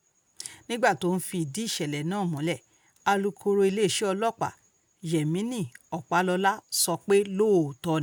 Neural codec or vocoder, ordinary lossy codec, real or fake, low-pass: none; none; real; none